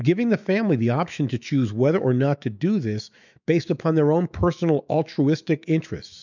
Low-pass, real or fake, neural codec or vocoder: 7.2 kHz; fake; autoencoder, 48 kHz, 128 numbers a frame, DAC-VAE, trained on Japanese speech